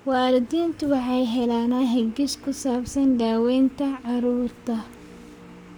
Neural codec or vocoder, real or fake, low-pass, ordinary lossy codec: codec, 44.1 kHz, 7.8 kbps, Pupu-Codec; fake; none; none